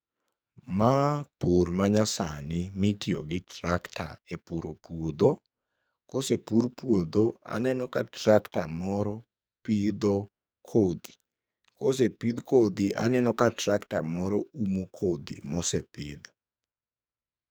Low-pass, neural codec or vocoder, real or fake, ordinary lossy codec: none; codec, 44.1 kHz, 2.6 kbps, SNAC; fake; none